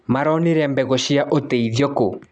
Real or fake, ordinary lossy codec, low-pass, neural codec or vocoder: real; none; 9.9 kHz; none